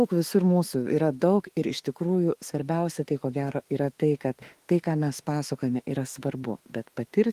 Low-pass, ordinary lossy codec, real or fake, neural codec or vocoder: 14.4 kHz; Opus, 24 kbps; fake; autoencoder, 48 kHz, 32 numbers a frame, DAC-VAE, trained on Japanese speech